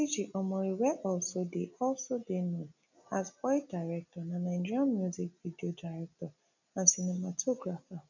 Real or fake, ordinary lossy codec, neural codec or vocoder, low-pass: real; none; none; 7.2 kHz